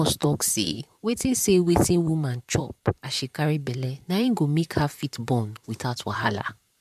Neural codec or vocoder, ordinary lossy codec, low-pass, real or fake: vocoder, 44.1 kHz, 128 mel bands, Pupu-Vocoder; MP3, 96 kbps; 14.4 kHz; fake